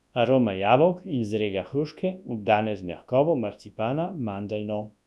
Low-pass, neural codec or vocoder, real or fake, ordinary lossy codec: none; codec, 24 kHz, 0.9 kbps, WavTokenizer, large speech release; fake; none